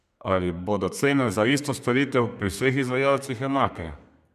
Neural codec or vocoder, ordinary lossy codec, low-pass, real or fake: codec, 32 kHz, 1.9 kbps, SNAC; none; 14.4 kHz; fake